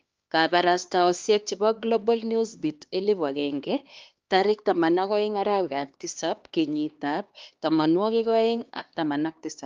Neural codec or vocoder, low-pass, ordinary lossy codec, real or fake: codec, 16 kHz, 2 kbps, X-Codec, HuBERT features, trained on LibriSpeech; 7.2 kHz; Opus, 24 kbps; fake